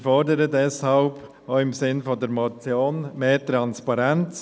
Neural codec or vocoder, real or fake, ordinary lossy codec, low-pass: none; real; none; none